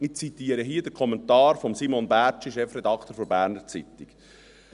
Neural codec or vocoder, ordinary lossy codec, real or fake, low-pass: none; none; real; 10.8 kHz